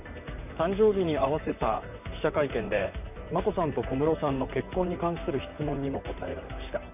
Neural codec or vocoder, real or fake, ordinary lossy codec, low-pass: vocoder, 44.1 kHz, 128 mel bands, Pupu-Vocoder; fake; none; 3.6 kHz